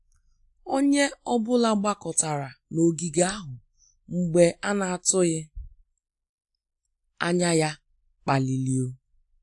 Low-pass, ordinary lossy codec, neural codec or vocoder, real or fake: 10.8 kHz; AAC, 64 kbps; none; real